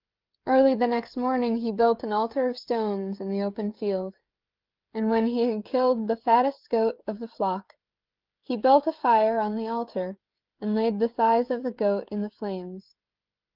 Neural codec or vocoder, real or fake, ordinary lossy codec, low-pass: codec, 16 kHz, 16 kbps, FreqCodec, smaller model; fake; Opus, 32 kbps; 5.4 kHz